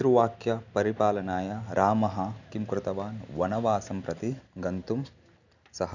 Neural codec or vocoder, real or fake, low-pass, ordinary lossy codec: none; real; 7.2 kHz; none